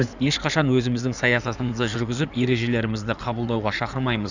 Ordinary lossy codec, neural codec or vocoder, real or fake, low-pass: none; codec, 16 kHz in and 24 kHz out, 2.2 kbps, FireRedTTS-2 codec; fake; 7.2 kHz